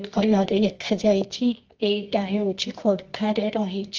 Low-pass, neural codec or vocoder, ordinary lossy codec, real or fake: 7.2 kHz; codec, 24 kHz, 0.9 kbps, WavTokenizer, medium music audio release; Opus, 24 kbps; fake